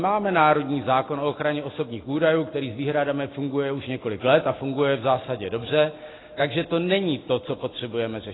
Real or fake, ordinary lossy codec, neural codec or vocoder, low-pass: real; AAC, 16 kbps; none; 7.2 kHz